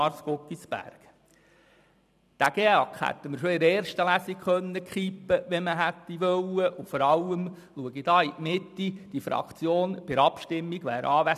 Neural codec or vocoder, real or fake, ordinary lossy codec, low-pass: vocoder, 44.1 kHz, 128 mel bands every 256 samples, BigVGAN v2; fake; none; 14.4 kHz